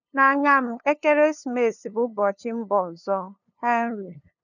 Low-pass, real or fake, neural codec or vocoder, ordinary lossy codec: 7.2 kHz; fake; codec, 16 kHz, 2 kbps, FunCodec, trained on LibriTTS, 25 frames a second; none